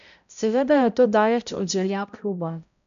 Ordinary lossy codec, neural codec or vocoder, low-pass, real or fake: none; codec, 16 kHz, 0.5 kbps, X-Codec, HuBERT features, trained on balanced general audio; 7.2 kHz; fake